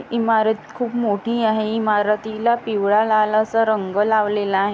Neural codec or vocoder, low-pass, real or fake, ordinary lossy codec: none; none; real; none